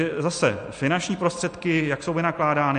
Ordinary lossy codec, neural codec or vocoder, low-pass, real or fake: MP3, 48 kbps; none; 9.9 kHz; real